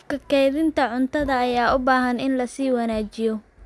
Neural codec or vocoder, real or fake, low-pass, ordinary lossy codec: none; real; none; none